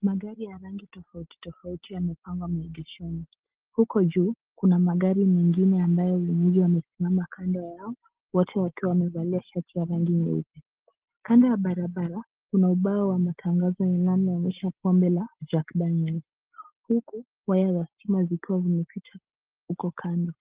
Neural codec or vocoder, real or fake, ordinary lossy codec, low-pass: none; real; Opus, 16 kbps; 3.6 kHz